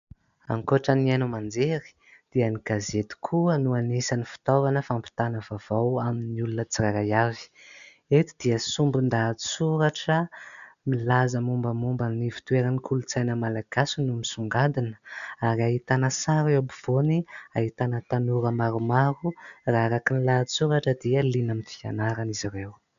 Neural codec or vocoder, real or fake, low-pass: none; real; 7.2 kHz